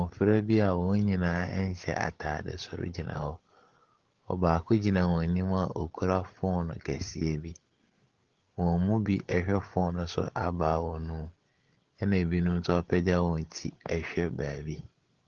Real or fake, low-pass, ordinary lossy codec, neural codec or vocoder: fake; 7.2 kHz; Opus, 16 kbps; codec, 16 kHz, 8 kbps, FreqCodec, larger model